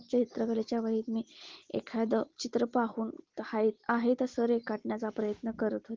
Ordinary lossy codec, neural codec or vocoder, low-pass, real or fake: Opus, 16 kbps; none; 7.2 kHz; real